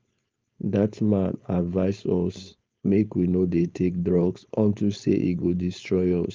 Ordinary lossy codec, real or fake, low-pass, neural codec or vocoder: Opus, 24 kbps; fake; 7.2 kHz; codec, 16 kHz, 4.8 kbps, FACodec